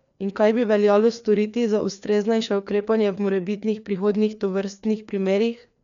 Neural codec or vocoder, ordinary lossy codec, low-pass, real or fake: codec, 16 kHz, 2 kbps, FreqCodec, larger model; none; 7.2 kHz; fake